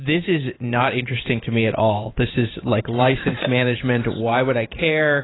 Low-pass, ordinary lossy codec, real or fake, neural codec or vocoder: 7.2 kHz; AAC, 16 kbps; fake; vocoder, 44.1 kHz, 128 mel bands every 512 samples, BigVGAN v2